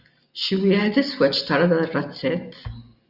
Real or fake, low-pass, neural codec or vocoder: real; 5.4 kHz; none